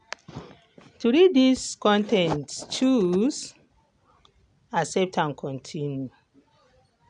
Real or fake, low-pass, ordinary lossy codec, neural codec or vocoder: real; 9.9 kHz; none; none